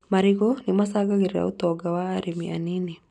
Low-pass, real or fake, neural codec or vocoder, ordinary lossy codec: 10.8 kHz; real; none; none